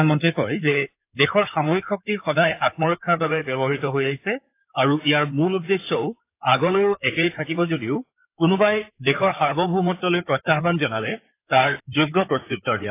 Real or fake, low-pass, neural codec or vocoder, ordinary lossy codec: fake; 3.6 kHz; codec, 16 kHz in and 24 kHz out, 2.2 kbps, FireRedTTS-2 codec; AAC, 24 kbps